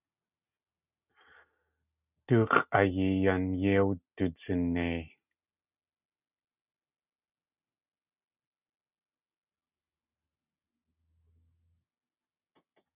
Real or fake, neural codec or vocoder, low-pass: real; none; 3.6 kHz